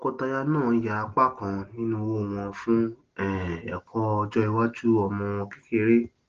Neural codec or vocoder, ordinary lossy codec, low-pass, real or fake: none; Opus, 16 kbps; 7.2 kHz; real